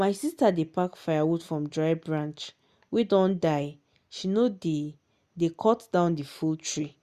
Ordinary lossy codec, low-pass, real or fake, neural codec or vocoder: Opus, 64 kbps; 14.4 kHz; real; none